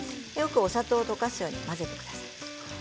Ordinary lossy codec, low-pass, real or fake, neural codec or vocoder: none; none; real; none